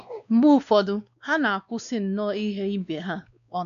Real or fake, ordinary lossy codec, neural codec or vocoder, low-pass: fake; none; codec, 16 kHz, 2 kbps, X-Codec, WavLM features, trained on Multilingual LibriSpeech; 7.2 kHz